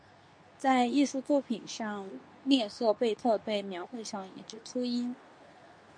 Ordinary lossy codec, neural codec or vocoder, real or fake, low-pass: MP3, 48 kbps; codec, 24 kHz, 0.9 kbps, WavTokenizer, medium speech release version 2; fake; 9.9 kHz